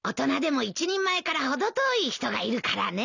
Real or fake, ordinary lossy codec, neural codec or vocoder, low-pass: real; none; none; 7.2 kHz